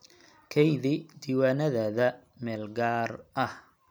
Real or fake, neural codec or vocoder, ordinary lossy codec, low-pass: real; none; none; none